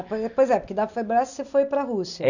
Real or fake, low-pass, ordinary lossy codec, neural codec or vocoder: real; 7.2 kHz; none; none